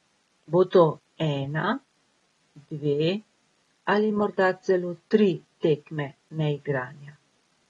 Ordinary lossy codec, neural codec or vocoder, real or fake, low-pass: AAC, 32 kbps; none; real; 10.8 kHz